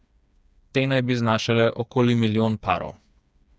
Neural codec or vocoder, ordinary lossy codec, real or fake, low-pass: codec, 16 kHz, 4 kbps, FreqCodec, smaller model; none; fake; none